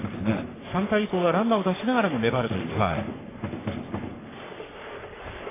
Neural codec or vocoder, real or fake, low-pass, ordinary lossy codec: codec, 44.1 kHz, 3.4 kbps, Pupu-Codec; fake; 3.6 kHz; AAC, 16 kbps